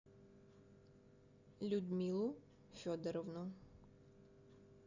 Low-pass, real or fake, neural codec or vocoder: 7.2 kHz; real; none